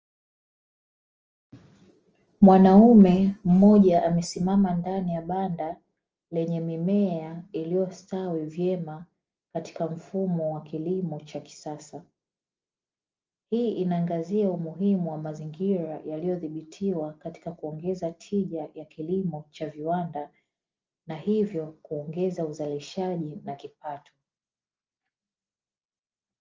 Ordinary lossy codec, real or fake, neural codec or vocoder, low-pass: Opus, 24 kbps; real; none; 7.2 kHz